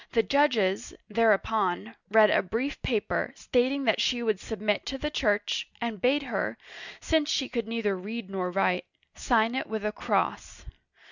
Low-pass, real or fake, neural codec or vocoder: 7.2 kHz; real; none